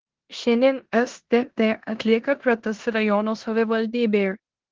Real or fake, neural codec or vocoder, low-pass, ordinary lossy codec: fake; codec, 16 kHz in and 24 kHz out, 0.9 kbps, LongCat-Audio-Codec, four codebook decoder; 7.2 kHz; Opus, 16 kbps